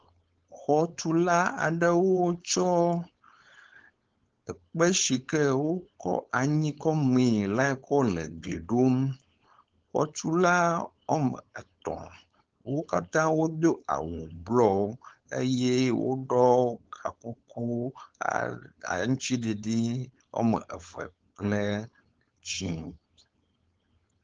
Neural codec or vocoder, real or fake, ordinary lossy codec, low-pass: codec, 16 kHz, 4.8 kbps, FACodec; fake; Opus, 16 kbps; 7.2 kHz